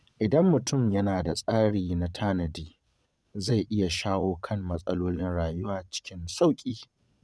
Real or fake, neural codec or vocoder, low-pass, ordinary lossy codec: fake; vocoder, 22.05 kHz, 80 mel bands, Vocos; none; none